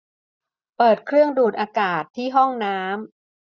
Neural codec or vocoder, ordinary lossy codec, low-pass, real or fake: none; none; none; real